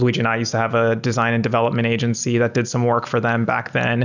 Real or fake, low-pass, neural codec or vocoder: real; 7.2 kHz; none